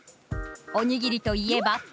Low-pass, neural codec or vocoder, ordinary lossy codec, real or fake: none; none; none; real